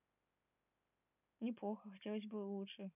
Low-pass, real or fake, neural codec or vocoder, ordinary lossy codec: 3.6 kHz; real; none; none